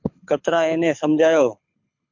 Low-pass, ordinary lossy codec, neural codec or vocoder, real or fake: 7.2 kHz; MP3, 48 kbps; codec, 24 kHz, 6 kbps, HILCodec; fake